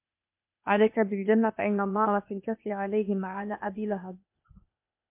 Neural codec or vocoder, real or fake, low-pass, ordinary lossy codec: codec, 16 kHz, 0.8 kbps, ZipCodec; fake; 3.6 kHz; MP3, 32 kbps